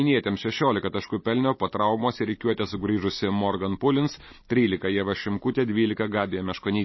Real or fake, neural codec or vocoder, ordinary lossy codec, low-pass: real; none; MP3, 24 kbps; 7.2 kHz